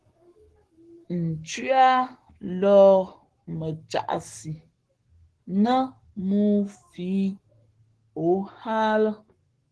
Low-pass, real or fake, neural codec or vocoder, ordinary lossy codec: 10.8 kHz; fake; codec, 44.1 kHz, 7.8 kbps, Pupu-Codec; Opus, 16 kbps